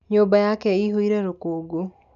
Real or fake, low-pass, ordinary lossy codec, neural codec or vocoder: real; 7.2 kHz; none; none